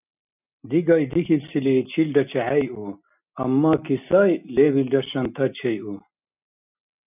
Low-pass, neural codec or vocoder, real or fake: 3.6 kHz; none; real